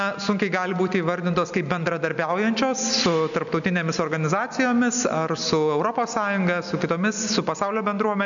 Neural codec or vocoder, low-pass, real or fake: none; 7.2 kHz; real